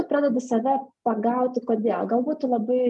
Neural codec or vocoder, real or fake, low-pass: none; real; 10.8 kHz